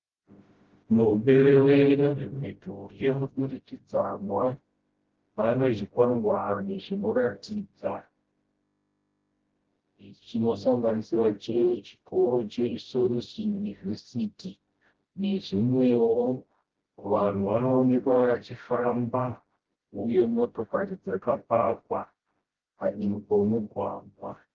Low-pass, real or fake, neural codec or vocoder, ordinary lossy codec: 7.2 kHz; fake; codec, 16 kHz, 0.5 kbps, FreqCodec, smaller model; Opus, 16 kbps